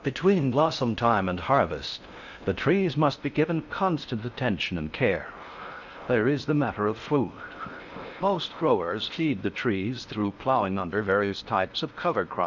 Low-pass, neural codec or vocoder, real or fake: 7.2 kHz; codec, 16 kHz in and 24 kHz out, 0.6 kbps, FocalCodec, streaming, 4096 codes; fake